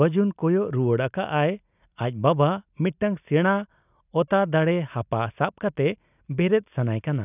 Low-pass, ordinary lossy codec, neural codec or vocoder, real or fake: 3.6 kHz; none; none; real